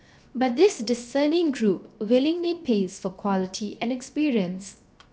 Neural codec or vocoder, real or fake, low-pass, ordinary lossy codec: codec, 16 kHz, 0.7 kbps, FocalCodec; fake; none; none